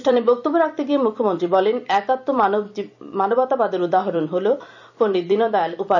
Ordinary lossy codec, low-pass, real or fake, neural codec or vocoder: none; 7.2 kHz; fake; vocoder, 44.1 kHz, 128 mel bands every 256 samples, BigVGAN v2